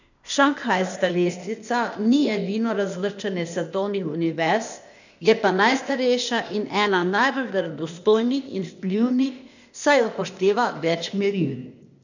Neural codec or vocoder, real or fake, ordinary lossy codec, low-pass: codec, 16 kHz, 0.8 kbps, ZipCodec; fake; none; 7.2 kHz